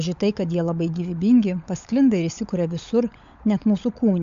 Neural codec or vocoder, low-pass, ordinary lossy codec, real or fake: codec, 16 kHz, 16 kbps, FunCodec, trained on LibriTTS, 50 frames a second; 7.2 kHz; AAC, 64 kbps; fake